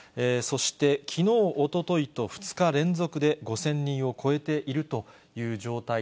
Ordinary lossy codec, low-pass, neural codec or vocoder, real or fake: none; none; none; real